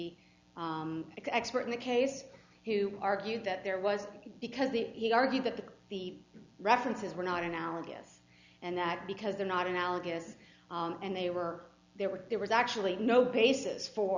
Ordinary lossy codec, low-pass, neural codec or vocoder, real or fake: Opus, 64 kbps; 7.2 kHz; none; real